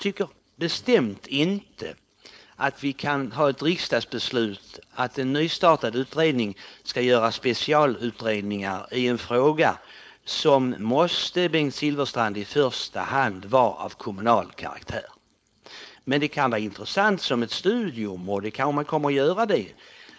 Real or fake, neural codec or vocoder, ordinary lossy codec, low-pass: fake; codec, 16 kHz, 4.8 kbps, FACodec; none; none